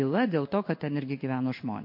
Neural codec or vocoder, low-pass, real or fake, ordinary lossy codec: codec, 16 kHz in and 24 kHz out, 1 kbps, XY-Tokenizer; 5.4 kHz; fake; MP3, 32 kbps